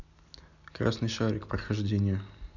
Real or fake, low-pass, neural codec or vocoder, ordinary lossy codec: real; 7.2 kHz; none; none